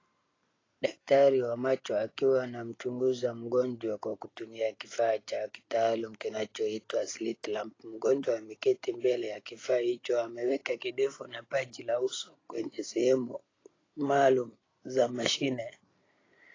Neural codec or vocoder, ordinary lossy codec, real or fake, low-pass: none; AAC, 32 kbps; real; 7.2 kHz